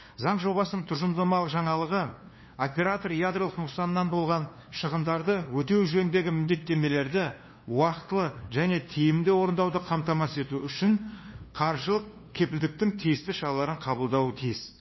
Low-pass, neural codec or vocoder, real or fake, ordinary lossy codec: 7.2 kHz; codec, 16 kHz, 2 kbps, FunCodec, trained on LibriTTS, 25 frames a second; fake; MP3, 24 kbps